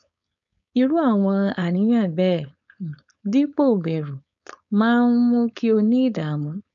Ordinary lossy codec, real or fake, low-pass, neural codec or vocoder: none; fake; 7.2 kHz; codec, 16 kHz, 4.8 kbps, FACodec